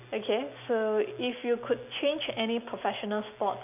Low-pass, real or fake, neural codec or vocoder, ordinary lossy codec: 3.6 kHz; real; none; none